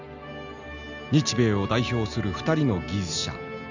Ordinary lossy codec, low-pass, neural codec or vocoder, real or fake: none; 7.2 kHz; none; real